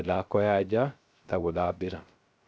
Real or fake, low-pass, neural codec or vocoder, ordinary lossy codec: fake; none; codec, 16 kHz, 0.3 kbps, FocalCodec; none